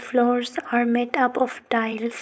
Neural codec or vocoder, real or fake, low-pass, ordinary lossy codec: codec, 16 kHz, 4.8 kbps, FACodec; fake; none; none